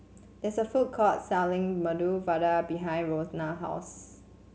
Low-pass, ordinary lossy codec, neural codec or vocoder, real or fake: none; none; none; real